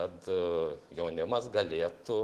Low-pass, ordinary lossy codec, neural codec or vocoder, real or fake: 14.4 kHz; Opus, 16 kbps; autoencoder, 48 kHz, 128 numbers a frame, DAC-VAE, trained on Japanese speech; fake